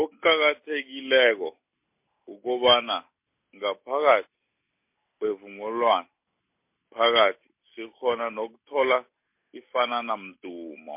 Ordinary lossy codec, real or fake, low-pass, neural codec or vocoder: MP3, 32 kbps; real; 3.6 kHz; none